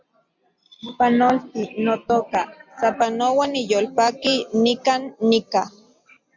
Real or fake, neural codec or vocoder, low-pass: real; none; 7.2 kHz